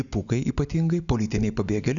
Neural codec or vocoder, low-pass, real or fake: none; 7.2 kHz; real